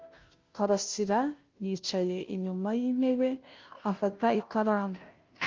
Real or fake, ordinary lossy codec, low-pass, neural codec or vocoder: fake; Opus, 32 kbps; 7.2 kHz; codec, 16 kHz, 0.5 kbps, FunCodec, trained on Chinese and English, 25 frames a second